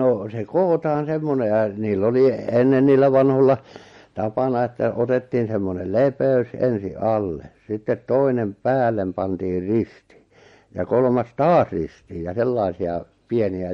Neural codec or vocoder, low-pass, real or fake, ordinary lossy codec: none; 19.8 kHz; real; MP3, 48 kbps